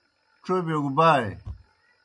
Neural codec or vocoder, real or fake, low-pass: none; real; 10.8 kHz